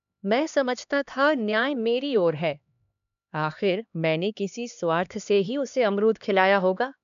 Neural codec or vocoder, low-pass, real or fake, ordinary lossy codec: codec, 16 kHz, 2 kbps, X-Codec, HuBERT features, trained on LibriSpeech; 7.2 kHz; fake; none